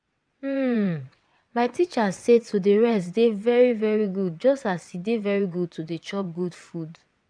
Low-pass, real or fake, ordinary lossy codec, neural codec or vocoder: 9.9 kHz; fake; MP3, 96 kbps; vocoder, 22.05 kHz, 80 mel bands, WaveNeXt